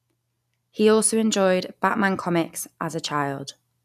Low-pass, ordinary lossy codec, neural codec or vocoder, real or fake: 14.4 kHz; none; none; real